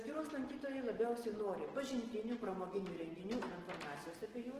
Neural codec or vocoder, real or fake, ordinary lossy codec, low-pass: none; real; Opus, 16 kbps; 14.4 kHz